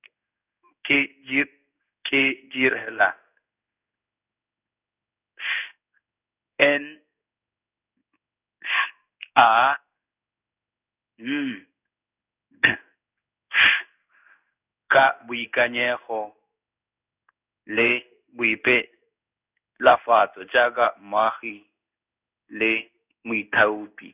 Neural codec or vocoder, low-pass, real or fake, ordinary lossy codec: codec, 16 kHz in and 24 kHz out, 1 kbps, XY-Tokenizer; 3.6 kHz; fake; none